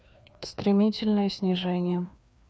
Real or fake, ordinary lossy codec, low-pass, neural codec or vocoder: fake; none; none; codec, 16 kHz, 2 kbps, FreqCodec, larger model